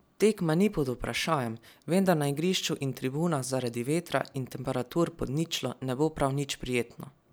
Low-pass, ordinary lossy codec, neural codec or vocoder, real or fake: none; none; none; real